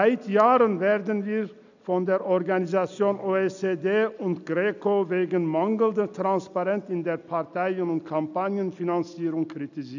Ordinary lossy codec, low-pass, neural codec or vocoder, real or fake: none; 7.2 kHz; none; real